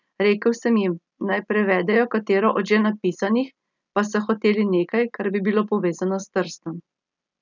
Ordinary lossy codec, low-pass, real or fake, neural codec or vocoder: none; 7.2 kHz; real; none